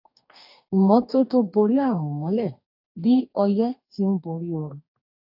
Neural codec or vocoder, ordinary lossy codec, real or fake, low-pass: codec, 44.1 kHz, 2.6 kbps, DAC; AAC, 48 kbps; fake; 5.4 kHz